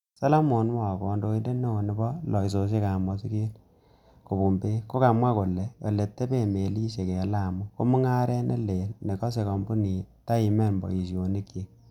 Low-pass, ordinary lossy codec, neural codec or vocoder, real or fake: 19.8 kHz; none; none; real